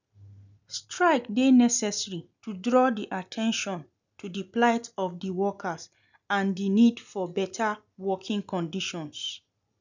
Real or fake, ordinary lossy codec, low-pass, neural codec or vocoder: real; none; 7.2 kHz; none